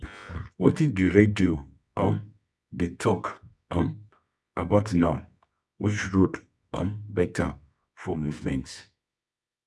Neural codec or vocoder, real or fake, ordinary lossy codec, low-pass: codec, 24 kHz, 0.9 kbps, WavTokenizer, medium music audio release; fake; none; none